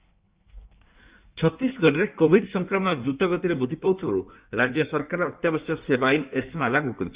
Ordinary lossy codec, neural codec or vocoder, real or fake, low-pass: Opus, 64 kbps; codec, 16 kHz in and 24 kHz out, 1.1 kbps, FireRedTTS-2 codec; fake; 3.6 kHz